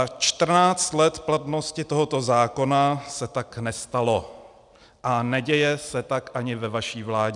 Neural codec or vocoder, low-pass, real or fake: none; 10.8 kHz; real